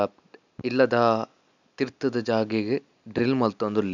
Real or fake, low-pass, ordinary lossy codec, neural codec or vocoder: real; 7.2 kHz; none; none